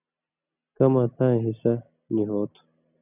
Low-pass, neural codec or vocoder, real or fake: 3.6 kHz; none; real